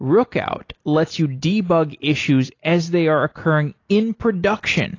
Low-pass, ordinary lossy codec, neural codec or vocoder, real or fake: 7.2 kHz; AAC, 32 kbps; none; real